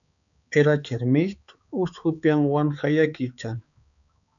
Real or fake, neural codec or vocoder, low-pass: fake; codec, 16 kHz, 4 kbps, X-Codec, HuBERT features, trained on balanced general audio; 7.2 kHz